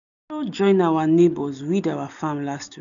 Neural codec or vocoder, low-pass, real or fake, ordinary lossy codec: none; 7.2 kHz; real; none